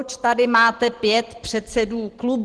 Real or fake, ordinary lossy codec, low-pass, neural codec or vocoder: real; Opus, 16 kbps; 10.8 kHz; none